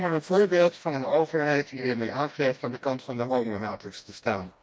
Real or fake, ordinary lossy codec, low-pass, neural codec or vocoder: fake; none; none; codec, 16 kHz, 1 kbps, FreqCodec, smaller model